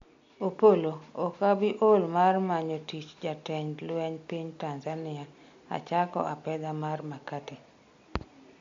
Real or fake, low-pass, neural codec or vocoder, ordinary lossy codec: real; 7.2 kHz; none; MP3, 48 kbps